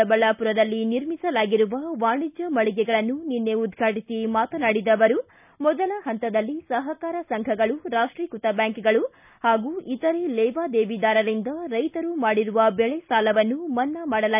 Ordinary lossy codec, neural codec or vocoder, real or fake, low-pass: none; none; real; 3.6 kHz